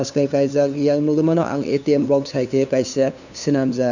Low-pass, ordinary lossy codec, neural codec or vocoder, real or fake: 7.2 kHz; none; codec, 16 kHz, 2 kbps, FunCodec, trained on LibriTTS, 25 frames a second; fake